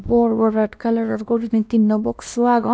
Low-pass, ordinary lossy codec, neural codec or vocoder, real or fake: none; none; codec, 16 kHz, 1 kbps, X-Codec, WavLM features, trained on Multilingual LibriSpeech; fake